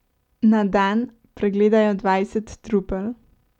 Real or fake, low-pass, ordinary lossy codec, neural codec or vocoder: real; 19.8 kHz; none; none